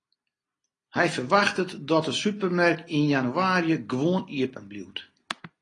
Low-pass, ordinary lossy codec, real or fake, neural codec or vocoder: 10.8 kHz; AAC, 32 kbps; real; none